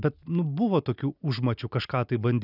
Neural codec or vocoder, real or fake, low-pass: none; real; 5.4 kHz